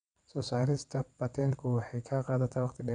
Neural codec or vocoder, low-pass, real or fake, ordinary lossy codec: vocoder, 22.05 kHz, 80 mel bands, WaveNeXt; 9.9 kHz; fake; none